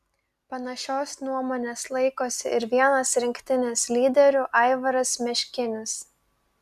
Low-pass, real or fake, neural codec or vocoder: 14.4 kHz; real; none